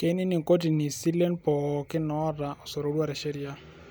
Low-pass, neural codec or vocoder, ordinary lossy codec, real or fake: none; none; none; real